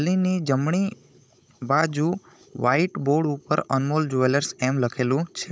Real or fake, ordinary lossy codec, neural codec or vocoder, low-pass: fake; none; codec, 16 kHz, 16 kbps, FunCodec, trained on Chinese and English, 50 frames a second; none